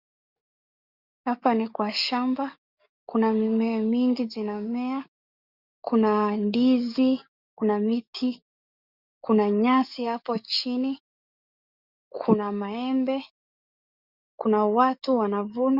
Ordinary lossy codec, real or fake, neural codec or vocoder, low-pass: Opus, 64 kbps; fake; codec, 44.1 kHz, 7.8 kbps, DAC; 5.4 kHz